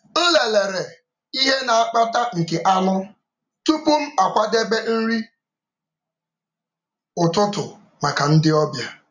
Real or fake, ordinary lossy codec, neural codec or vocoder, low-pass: real; none; none; 7.2 kHz